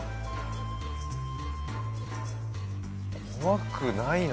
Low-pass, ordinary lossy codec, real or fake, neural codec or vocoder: none; none; real; none